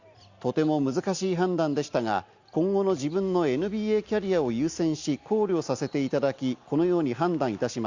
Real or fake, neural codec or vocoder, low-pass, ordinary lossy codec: real; none; 7.2 kHz; Opus, 64 kbps